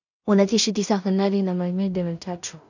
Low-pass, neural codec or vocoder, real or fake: 7.2 kHz; codec, 16 kHz in and 24 kHz out, 0.4 kbps, LongCat-Audio-Codec, two codebook decoder; fake